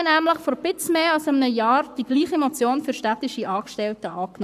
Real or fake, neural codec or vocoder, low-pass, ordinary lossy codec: fake; codec, 44.1 kHz, 7.8 kbps, Pupu-Codec; 14.4 kHz; none